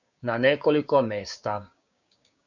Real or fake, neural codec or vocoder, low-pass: fake; codec, 16 kHz, 6 kbps, DAC; 7.2 kHz